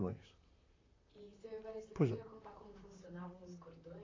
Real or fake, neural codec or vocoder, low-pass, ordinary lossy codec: fake; vocoder, 44.1 kHz, 128 mel bands, Pupu-Vocoder; 7.2 kHz; AAC, 32 kbps